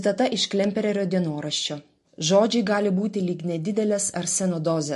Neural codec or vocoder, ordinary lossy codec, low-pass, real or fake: none; MP3, 48 kbps; 14.4 kHz; real